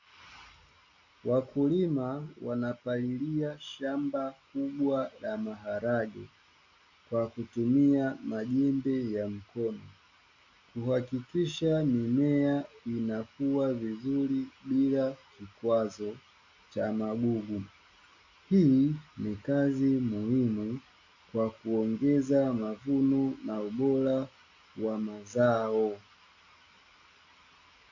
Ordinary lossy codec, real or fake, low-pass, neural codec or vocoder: AAC, 48 kbps; real; 7.2 kHz; none